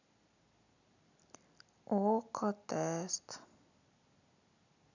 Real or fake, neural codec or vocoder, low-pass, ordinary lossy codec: real; none; 7.2 kHz; none